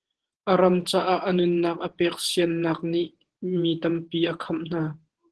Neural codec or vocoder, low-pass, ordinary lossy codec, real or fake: vocoder, 48 kHz, 128 mel bands, Vocos; 10.8 kHz; Opus, 16 kbps; fake